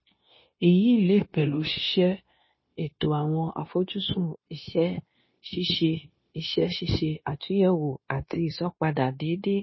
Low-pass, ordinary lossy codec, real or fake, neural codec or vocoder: 7.2 kHz; MP3, 24 kbps; fake; codec, 16 kHz, 0.9 kbps, LongCat-Audio-Codec